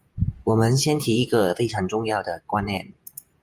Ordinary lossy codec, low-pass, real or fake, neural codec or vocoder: Opus, 32 kbps; 14.4 kHz; fake; vocoder, 48 kHz, 128 mel bands, Vocos